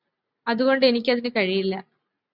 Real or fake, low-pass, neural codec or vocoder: real; 5.4 kHz; none